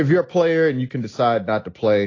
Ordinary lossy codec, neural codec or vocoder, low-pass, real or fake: AAC, 32 kbps; none; 7.2 kHz; real